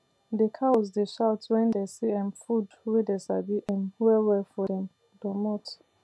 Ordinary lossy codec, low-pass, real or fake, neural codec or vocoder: none; none; real; none